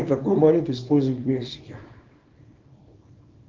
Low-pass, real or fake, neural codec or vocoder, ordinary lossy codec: 7.2 kHz; fake; codec, 24 kHz, 0.9 kbps, WavTokenizer, small release; Opus, 24 kbps